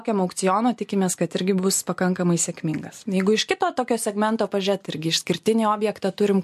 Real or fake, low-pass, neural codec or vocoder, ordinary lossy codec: real; 14.4 kHz; none; MP3, 64 kbps